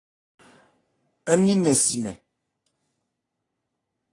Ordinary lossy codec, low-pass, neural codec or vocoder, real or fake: AAC, 32 kbps; 10.8 kHz; codec, 44.1 kHz, 3.4 kbps, Pupu-Codec; fake